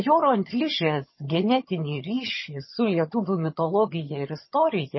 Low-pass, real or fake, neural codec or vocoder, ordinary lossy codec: 7.2 kHz; fake; vocoder, 22.05 kHz, 80 mel bands, HiFi-GAN; MP3, 24 kbps